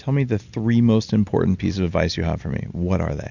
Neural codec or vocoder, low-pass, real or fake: none; 7.2 kHz; real